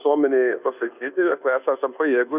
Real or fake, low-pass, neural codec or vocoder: fake; 3.6 kHz; codec, 24 kHz, 1.2 kbps, DualCodec